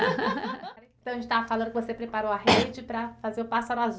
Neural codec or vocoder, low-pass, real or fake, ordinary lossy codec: none; none; real; none